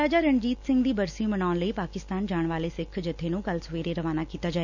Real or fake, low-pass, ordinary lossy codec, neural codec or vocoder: real; 7.2 kHz; none; none